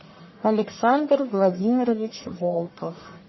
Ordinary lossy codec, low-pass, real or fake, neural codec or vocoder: MP3, 24 kbps; 7.2 kHz; fake; codec, 44.1 kHz, 1.7 kbps, Pupu-Codec